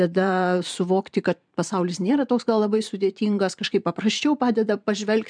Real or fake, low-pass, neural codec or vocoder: fake; 9.9 kHz; vocoder, 44.1 kHz, 128 mel bands every 512 samples, BigVGAN v2